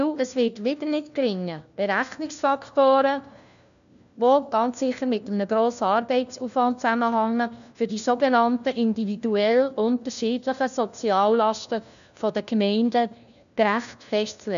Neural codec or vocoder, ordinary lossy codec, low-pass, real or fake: codec, 16 kHz, 1 kbps, FunCodec, trained on LibriTTS, 50 frames a second; none; 7.2 kHz; fake